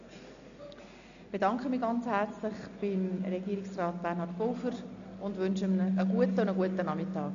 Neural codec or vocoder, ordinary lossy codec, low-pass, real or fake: none; none; 7.2 kHz; real